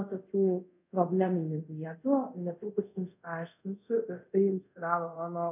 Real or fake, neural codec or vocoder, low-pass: fake; codec, 24 kHz, 0.5 kbps, DualCodec; 3.6 kHz